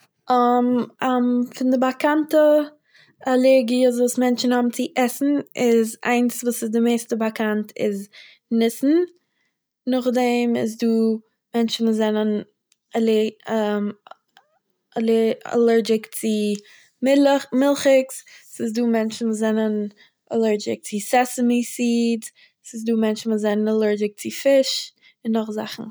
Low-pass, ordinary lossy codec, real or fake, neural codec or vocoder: none; none; real; none